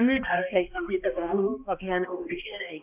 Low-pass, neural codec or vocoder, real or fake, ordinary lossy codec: 3.6 kHz; codec, 16 kHz, 1 kbps, X-Codec, HuBERT features, trained on balanced general audio; fake; none